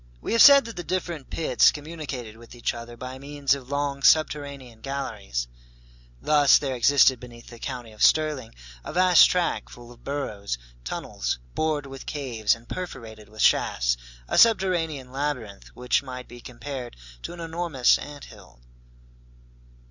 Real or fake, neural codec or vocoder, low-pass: real; none; 7.2 kHz